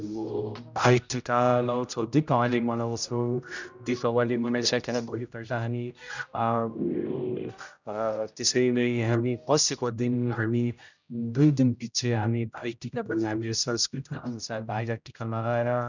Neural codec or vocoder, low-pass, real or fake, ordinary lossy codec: codec, 16 kHz, 0.5 kbps, X-Codec, HuBERT features, trained on general audio; 7.2 kHz; fake; none